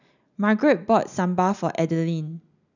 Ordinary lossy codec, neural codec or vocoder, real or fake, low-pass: none; none; real; 7.2 kHz